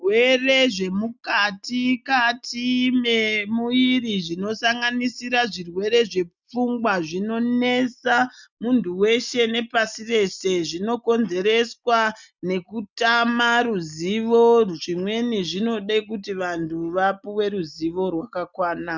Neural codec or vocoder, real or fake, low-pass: none; real; 7.2 kHz